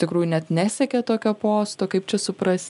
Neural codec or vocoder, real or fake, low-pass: none; real; 10.8 kHz